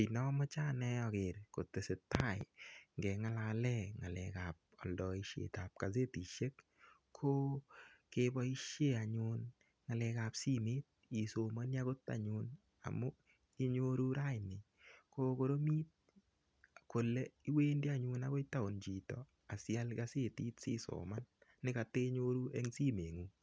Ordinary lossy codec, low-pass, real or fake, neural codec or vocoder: none; none; real; none